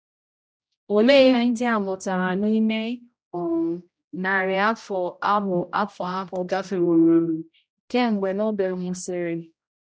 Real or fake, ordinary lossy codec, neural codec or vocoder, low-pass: fake; none; codec, 16 kHz, 0.5 kbps, X-Codec, HuBERT features, trained on general audio; none